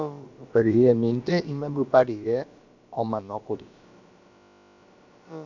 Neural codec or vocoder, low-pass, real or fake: codec, 16 kHz, about 1 kbps, DyCAST, with the encoder's durations; 7.2 kHz; fake